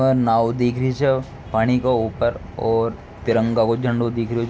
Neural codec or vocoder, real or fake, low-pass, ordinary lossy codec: none; real; none; none